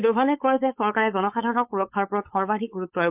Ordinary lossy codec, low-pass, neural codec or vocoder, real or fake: MP3, 32 kbps; 3.6 kHz; codec, 16 kHz, 4.8 kbps, FACodec; fake